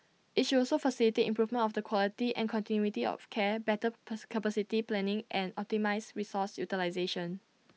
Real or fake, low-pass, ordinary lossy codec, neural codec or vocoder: real; none; none; none